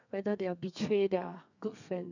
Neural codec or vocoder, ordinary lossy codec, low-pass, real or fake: codec, 32 kHz, 1.9 kbps, SNAC; none; 7.2 kHz; fake